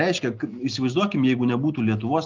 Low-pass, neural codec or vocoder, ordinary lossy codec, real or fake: 7.2 kHz; none; Opus, 32 kbps; real